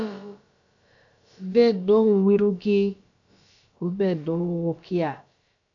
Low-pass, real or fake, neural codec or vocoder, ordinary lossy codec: 7.2 kHz; fake; codec, 16 kHz, about 1 kbps, DyCAST, with the encoder's durations; AAC, 64 kbps